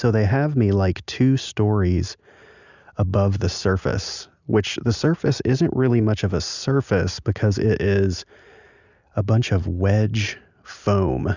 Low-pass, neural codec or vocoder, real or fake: 7.2 kHz; none; real